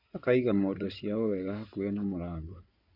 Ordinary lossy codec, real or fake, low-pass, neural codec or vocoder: none; fake; 5.4 kHz; codec, 16 kHz in and 24 kHz out, 2.2 kbps, FireRedTTS-2 codec